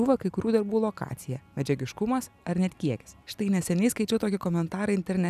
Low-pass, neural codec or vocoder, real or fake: 14.4 kHz; none; real